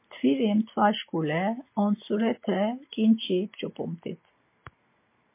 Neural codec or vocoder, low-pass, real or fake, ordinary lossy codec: none; 3.6 kHz; real; MP3, 24 kbps